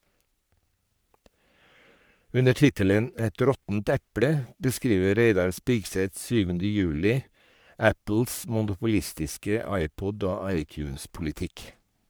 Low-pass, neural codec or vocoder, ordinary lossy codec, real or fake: none; codec, 44.1 kHz, 3.4 kbps, Pupu-Codec; none; fake